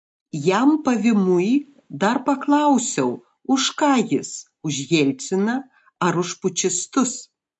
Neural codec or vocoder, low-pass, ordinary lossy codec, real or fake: none; 10.8 kHz; MP3, 48 kbps; real